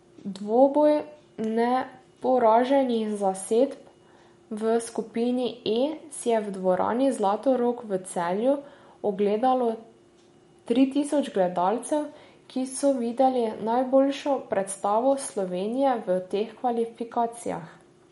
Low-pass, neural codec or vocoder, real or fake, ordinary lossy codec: 19.8 kHz; none; real; MP3, 48 kbps